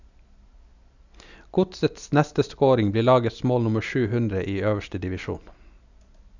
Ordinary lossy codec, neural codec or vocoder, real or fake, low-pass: none; none; real; 7.2 kHz